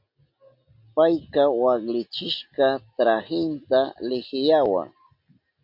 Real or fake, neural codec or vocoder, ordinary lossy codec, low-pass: real; none; MP3, 48 kbps; 5.4 kHz